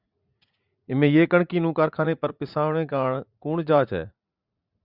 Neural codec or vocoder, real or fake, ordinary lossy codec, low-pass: vocoder, 44.1 kHz, 80 mel bands, Vocos; fake; Opus, 64 kbps; 5.4 kHz